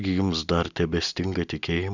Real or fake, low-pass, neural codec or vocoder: real; 7.2 kHz; none